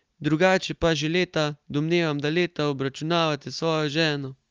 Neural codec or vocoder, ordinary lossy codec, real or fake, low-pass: none; Opus, 32 kbps; real; 7.2 kHz